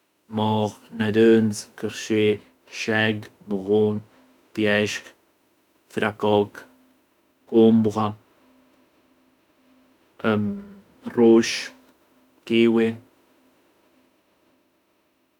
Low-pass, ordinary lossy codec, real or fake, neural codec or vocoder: 19.8 kHz; none; fake; autoencoder, 48 kHz, 32 numbers a frame, DAC-VAE, trained on Japanese speech